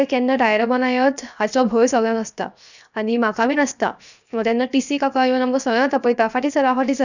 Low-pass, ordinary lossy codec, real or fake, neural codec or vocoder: 7.2 kHz; none; fake; codec, 16 kHz, 0.7 kbps, FocalCodec